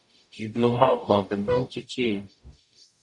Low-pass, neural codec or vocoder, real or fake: 10.8 kHz; codec, 44.1 kHz, 0.9 kbps, DAC; fake